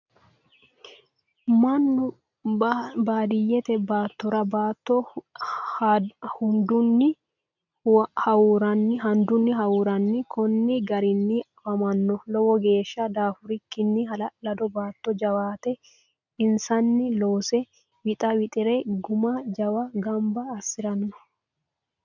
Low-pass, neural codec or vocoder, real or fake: 7.2 kHz; none; real